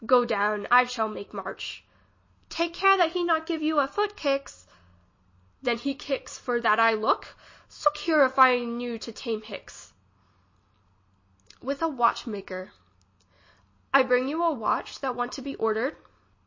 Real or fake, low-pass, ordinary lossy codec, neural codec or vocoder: real; 7.2 kHz; MP3, 32 kbps; none